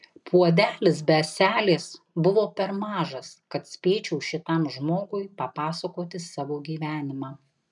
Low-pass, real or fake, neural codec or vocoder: 10.8 kHz; real; none